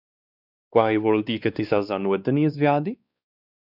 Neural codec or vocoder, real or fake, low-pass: codec, 16 kHz, 2 kbps, X-Codec, WavLM features, trained on Multilingual LibriSpeech; fake; 5.4 kHz